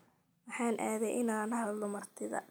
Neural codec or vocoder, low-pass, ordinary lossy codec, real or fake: none; none; none; real